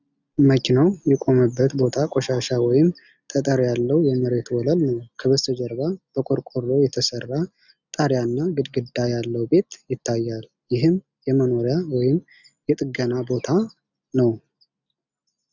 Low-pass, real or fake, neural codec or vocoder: 7.2 kHz; real; none